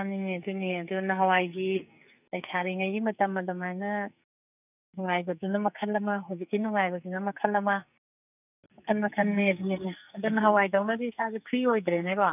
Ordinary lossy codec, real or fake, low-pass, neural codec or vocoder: none; fake; 3.6 kHz; codec, 44.1 kHz, 2.6 kbps, SNAC